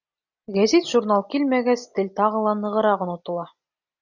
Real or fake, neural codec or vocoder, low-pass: real; none; 7.2 kHz